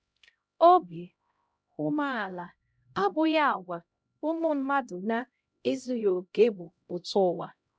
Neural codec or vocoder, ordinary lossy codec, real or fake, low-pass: codec, 16 kHz, 0.5 kbps, X-Codec, HuBERT features, trained on LibriSpeech; none; fake; none